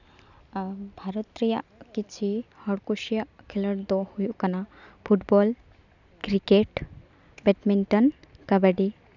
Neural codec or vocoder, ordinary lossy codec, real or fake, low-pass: none; none; real; 7.2 kHz